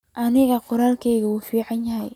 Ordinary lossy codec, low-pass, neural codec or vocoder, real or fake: none; 19.8 kHz; none; real